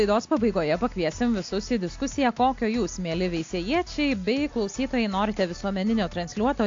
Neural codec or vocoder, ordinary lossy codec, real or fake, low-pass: none; AAC, 48 kbps; real; 7.2 kHz